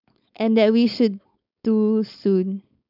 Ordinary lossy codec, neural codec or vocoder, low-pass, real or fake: none; codec, 16 kHz, 4.8 kbps, FACodec; 5.4 kHz; fake